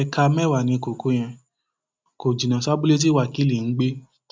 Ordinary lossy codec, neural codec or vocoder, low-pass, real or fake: none; none; none; real